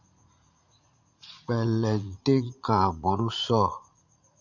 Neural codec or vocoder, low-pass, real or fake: vocoder, 44.1 kHz, 80 mel bands, Vocos; 7.2 kHz; fake